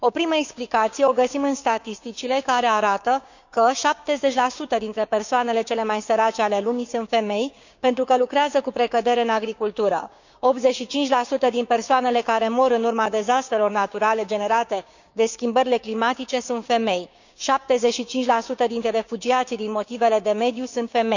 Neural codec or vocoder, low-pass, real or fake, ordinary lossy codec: codec, 16 kHz, 6 kbps, DAC; 7.2 kHz; fake; none